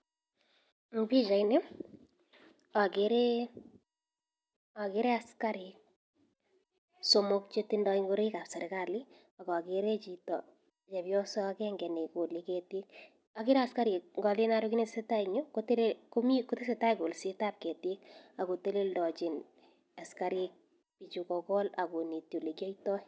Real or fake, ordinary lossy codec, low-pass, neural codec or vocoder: real; none; none; none